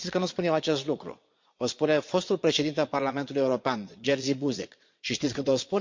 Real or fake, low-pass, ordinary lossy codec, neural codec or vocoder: fake; 7.2 kHz; MP3, 48 kbps; vocoder, 22.05 kHz, 80 mel bands, Vocos